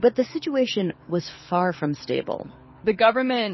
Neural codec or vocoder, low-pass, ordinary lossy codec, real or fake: codec, 24 kHz, 6 kbps, HILCodec; 7.2 kHz; MP3, 24 kbps; fake